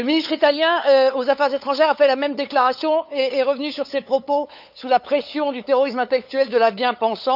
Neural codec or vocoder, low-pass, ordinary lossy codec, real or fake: codec, 16 kHz, 8 kbps, FunCodec, trained on LibriTTS, 25 frames a second; 5.4 kHz; none; fake